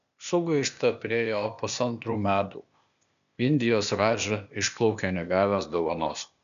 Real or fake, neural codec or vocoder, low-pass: fake; codec, 16 kHz, 0.8 kbps, ZipCodec; 7.2 kHz